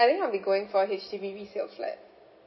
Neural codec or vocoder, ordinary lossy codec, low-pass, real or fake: none; MP3, 24 kbps; 7.2 kHz; real